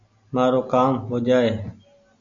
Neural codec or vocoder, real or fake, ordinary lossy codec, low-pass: none; real; AAC, 64 kbps; 7.2 kHz